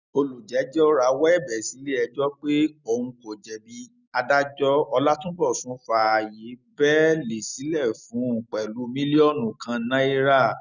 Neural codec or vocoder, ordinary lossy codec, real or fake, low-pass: none; none; real; 7.2 kHz